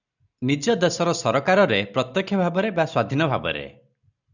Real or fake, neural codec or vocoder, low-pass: real; none; 7.2 kHz